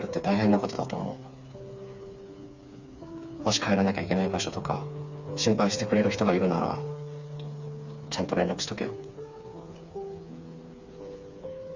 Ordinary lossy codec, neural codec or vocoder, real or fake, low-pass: Opus, 64 kbps; codec, 16 kHz, 4 kbps, FreqCodec, smaller model; fake; 7.2 kHz